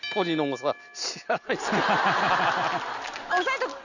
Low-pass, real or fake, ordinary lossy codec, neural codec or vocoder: 7.2 kHz; real; none; none